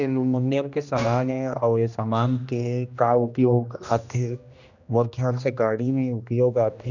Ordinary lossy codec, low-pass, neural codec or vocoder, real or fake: none; 7.2 kHz; codec, 16 kHz, 1 kbps, X-Codec, HuBERT features, trained on general audio; fake